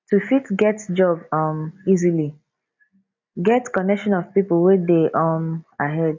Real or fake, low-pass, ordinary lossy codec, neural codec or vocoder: real; 7.2 kHz; MP3, 48 kbps; none